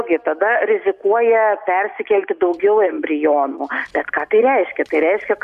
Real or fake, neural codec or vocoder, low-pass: real; none; 14.4 kHz